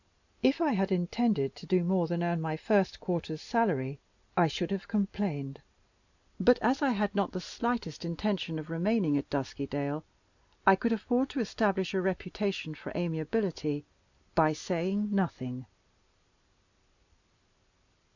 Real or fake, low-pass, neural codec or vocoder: real; 7.2 kHz; none